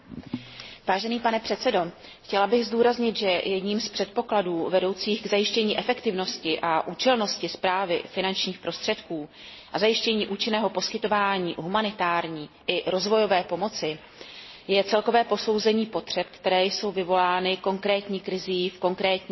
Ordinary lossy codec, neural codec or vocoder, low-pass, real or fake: MP3, 24 kbps; none; 7.2 kHz; real